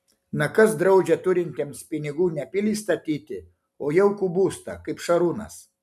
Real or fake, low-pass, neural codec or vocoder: real; 14.4 kHz; none